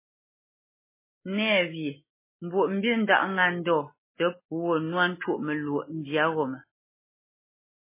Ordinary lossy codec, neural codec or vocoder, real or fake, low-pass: MP3, 16 kbps; none; real; 3.6 kHz